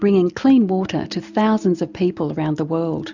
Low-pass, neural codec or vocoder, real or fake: 7.2 kHz; none; real